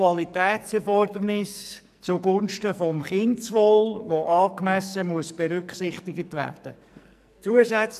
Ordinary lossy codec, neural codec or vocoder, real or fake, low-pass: none; codec, 44.1 kHz, 2.6 kbps, SNAC; fake; 14.4 kHz